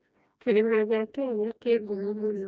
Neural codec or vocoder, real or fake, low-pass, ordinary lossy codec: codec, 16 kHz, 1 kbps, FreqCodec, smaller model; fake; none; none